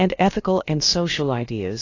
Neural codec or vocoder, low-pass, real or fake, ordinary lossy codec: codec, 16 kHz, about 1 kbps, DyCAST, with the encoder's durations; 7.2 kHz; fake; AAC, 32 kbps